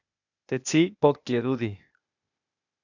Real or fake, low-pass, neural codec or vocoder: fake; 7.2 kHz; codec, 16 kHz, 0.8 kbps, ZipCodec